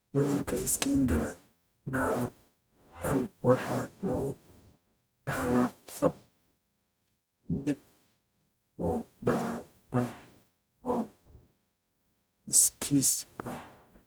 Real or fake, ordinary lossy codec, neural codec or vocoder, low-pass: fake; none; codec, 44.1 kHz, 0.9 kbps, DAC; none